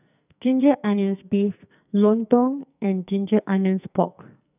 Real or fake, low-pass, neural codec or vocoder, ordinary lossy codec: fake; 3.6 kHz; codec, 44.1 kHz, 2.6 kbps, SNAC; none